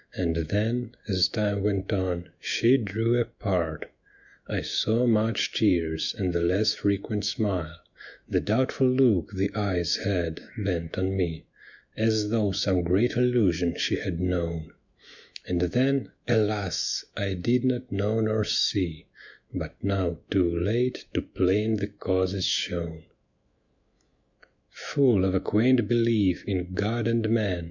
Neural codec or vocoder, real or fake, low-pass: autoencoder, 48 kHz, 128 numbers a frame, DAC-VAE, trained on Japanese speech; fake; 7.2 kHz